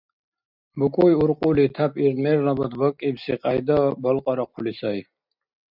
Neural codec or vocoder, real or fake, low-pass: none; real; 5.4 kHz